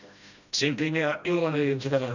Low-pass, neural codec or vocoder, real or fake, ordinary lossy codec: 7.2 kHz; codec, 16 kHz, 1 kbps, FreqCodec, smaller model; fake; none